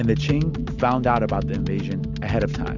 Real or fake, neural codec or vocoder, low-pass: real; none; 7.2 kHz